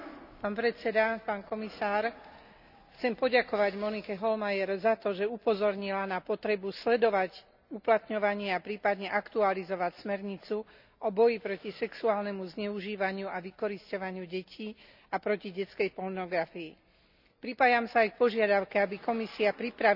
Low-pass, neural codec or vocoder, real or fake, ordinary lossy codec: 5.4 kHz; none; real; none